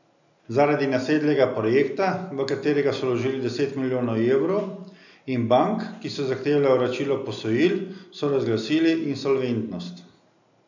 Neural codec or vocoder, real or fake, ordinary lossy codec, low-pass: none; real; none; 7.2 kHz